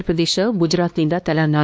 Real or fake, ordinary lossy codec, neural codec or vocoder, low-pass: fake; none; codec, 16 kHz, 2 kbps, X-Codec, WavLM features, trained on Multilingual LibriSpeech; none